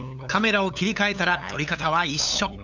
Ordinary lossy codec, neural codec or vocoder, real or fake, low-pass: none; codec, 16 kHz, 8 kbps, FunCodec, trained on LibriTTS, 25 frames a second; fake; 7.2 kHz